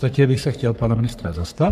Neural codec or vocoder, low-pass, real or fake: codec, 44.1 kHz, 3.4 kbps, Pupu-Codec; 14.4 kHz; fake